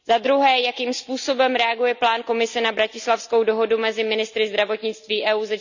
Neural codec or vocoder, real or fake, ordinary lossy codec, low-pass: none; real; none; 7.2 kHz